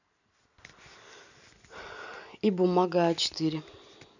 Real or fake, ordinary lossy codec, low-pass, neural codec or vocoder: real; none; 7.2 kHz; none